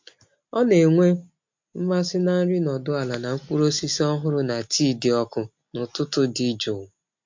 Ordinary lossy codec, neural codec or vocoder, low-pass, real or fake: MP3, 48 kbps; none; 7.2 kHz; real